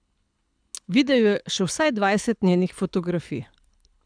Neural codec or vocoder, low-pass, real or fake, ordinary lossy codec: codec, 24 kHz, 6 kbps, HILCodec; 9.9 kHz; fake; none